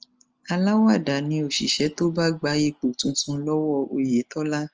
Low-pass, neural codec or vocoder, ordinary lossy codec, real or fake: 7.2 kHz; none; Opus, 24 kbps; real